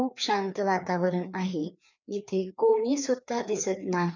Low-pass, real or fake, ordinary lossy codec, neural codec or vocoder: 7.2 kHz; fake; none; codec, 16 kHz, 2 kbps, FreqCodec, larger model